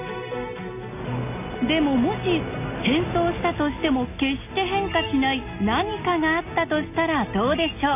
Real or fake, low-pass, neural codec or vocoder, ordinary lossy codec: real; 3.6 kHz; none; none